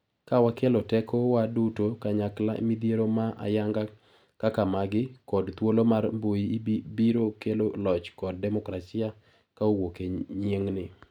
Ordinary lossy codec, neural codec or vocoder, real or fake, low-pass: none; none; real; 19.8 kHz